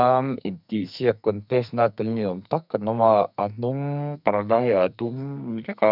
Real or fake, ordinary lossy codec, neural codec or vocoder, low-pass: fake; none; codec, 44.1 kHz, 2.6 kbps, SNAC; 5.4 kHz